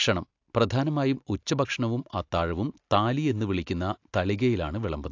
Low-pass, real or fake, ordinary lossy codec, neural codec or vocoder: 7.2 kHz; real; AAC, 48 kbps; none